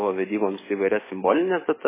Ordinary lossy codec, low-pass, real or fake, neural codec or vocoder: MP3, 16 kbps; 3.6 kHz; fake; codec, 44.1 kHz, 7.8 kbps, DAC